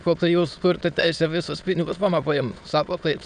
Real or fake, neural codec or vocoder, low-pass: fake; autoencoder, 22.05 kHz, a latent of 192 numbers a frame, VITS, trained on many speakers; 9.9 kHz